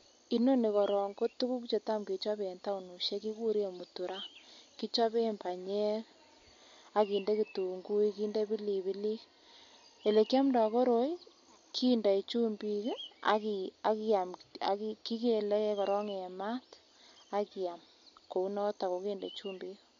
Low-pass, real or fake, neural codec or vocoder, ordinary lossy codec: 7.2 kHz; real; none; MP3, 48 kbps